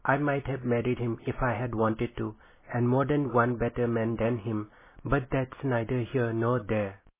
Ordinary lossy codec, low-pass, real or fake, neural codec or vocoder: MP3, 16 kbps; 3.6 kHz; real; none